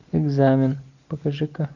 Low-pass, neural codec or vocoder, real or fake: 7.2 kHz; none; real